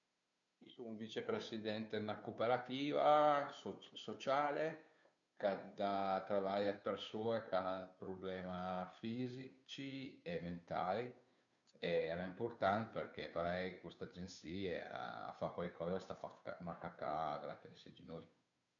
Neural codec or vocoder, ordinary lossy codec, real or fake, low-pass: codec, 16 kHz, 2 kbps, FunCodec, trained on Chinese and English, 25 frames a second; none; fake; 7.2 kHz